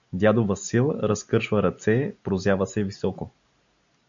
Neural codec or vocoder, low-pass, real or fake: none; 7.2 kHz; real